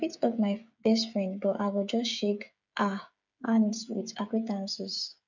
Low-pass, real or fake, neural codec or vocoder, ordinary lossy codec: 7.2 kHz; fake; codec, 16 kHz, 8 kbps, FreqCodec, smaller model; none